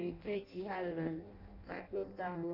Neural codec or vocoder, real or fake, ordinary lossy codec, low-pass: codec, 16 kHz in and 24 kHz out, 0.6 kbps, FireRedTTS-2 codec; fake; none; 5.4 kHz